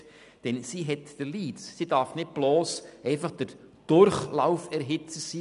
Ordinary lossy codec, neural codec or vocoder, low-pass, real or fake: none; none; 10.8 kHz; real